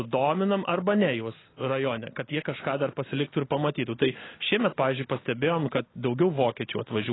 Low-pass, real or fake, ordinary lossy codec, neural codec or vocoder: 7.2 kHz; real; AAC, 16 kbps; none